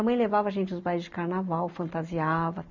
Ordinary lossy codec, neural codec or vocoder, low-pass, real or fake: none; none; 7.2 kHz; real